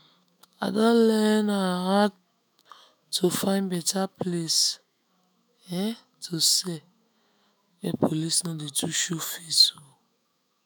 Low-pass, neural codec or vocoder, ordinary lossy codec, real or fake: none; autoencoder, 48 kHz, 128 numbers a frame, DAC-VAE, trained on Japanese speech; none; fake